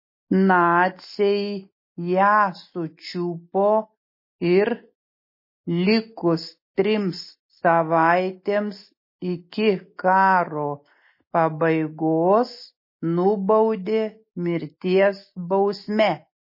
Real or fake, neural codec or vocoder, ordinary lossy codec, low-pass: real; none; MP3, 24 kbps; 5.4 kHz